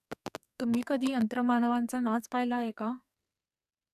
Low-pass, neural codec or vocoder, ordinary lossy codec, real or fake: 14.4 kHz; codec, 44.1 kHz, 2.6 kbps, SNAC; none; fake